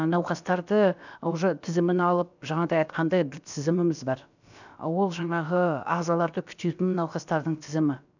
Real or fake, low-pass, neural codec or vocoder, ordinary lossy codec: fake; 7.2 kHz; codec, 16 kHz, about 1 kbps, DyCAST, with the encoder's durations; none